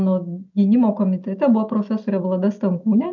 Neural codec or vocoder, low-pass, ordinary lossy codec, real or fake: none; 7.2 kHz; MP3, 64 kbps; real